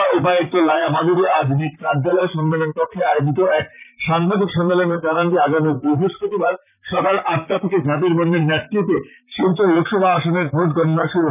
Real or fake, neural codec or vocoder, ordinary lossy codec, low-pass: fake; vocoder, 44.1 kHz, 128 mel bands, Pupu-Vocoder; none; 3.6 kHz